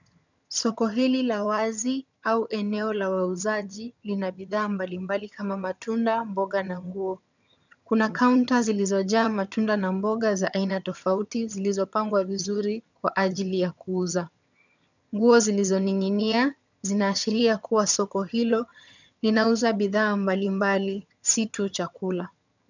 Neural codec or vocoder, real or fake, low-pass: vocoder, 22.05 kHz, 80 mel bands, HiFi-GAN; fake; 7.2 kHz